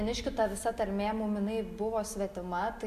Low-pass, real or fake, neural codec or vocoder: 14.4 kHz; real; none